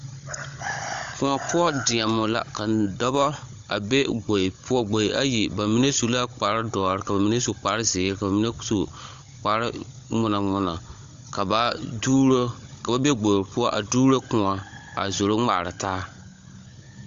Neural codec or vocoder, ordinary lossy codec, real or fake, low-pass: codec, 16 kHz, 16 kbps, FunCodec, trained on Chinese and English, 50 frames a second; AAC, 64 kbps; fake; 7.2 kHz